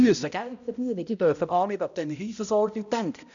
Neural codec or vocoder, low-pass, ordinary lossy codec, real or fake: codec, 16 kHz, 0.5 kbps, X-Codec, HuBERT features, trained on balanced general audio; 7.2 kHz; none; fake